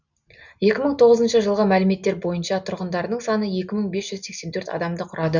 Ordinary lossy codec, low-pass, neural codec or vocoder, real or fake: none; 7.2 kHz; none; real